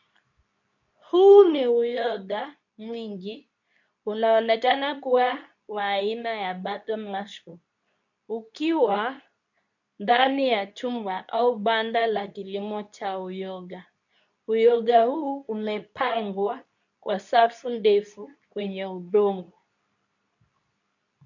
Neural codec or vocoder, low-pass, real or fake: codec, 24 kHz, 0.9 kbps, WavTokenizer, medium speech release version 2; 7.2 kHz; fake